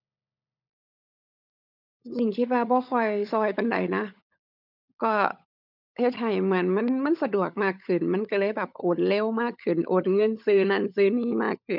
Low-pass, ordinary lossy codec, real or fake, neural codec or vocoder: 5.4 kHz; none; fake; codec, 16 kHz, 16 kbps, FunCodec, trained on LibriTTS, 50 frames a second